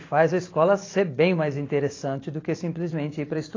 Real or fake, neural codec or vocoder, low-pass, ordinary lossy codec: fake; vocoder, 44.1 kHz, 128 mel bands every 512 samples, BigVGAN v2; 7.2 kHz; AAC, 32 kbps